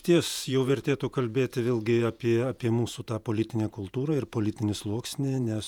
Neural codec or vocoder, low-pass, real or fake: vocoder, 48 kHz, 128 mel bands, Vocos; 19.8 kHz; fake